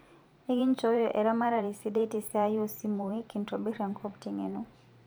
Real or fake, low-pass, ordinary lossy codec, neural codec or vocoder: fake; none; none; vocoder, 44.1 kHz, 128 mel bands every 512 samples, BigVGAN v2